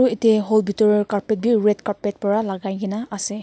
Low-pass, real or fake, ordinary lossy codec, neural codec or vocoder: none; real; none; none